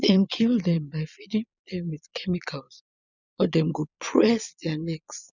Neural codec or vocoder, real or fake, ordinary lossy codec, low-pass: vocoder, 22.05 kHz, 80 mel bands, Vocos; fake; none; 7.2 kHz